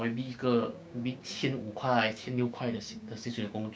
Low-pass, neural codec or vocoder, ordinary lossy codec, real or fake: none; codec, 16 kHz, 6 kbps, DAC; none; fake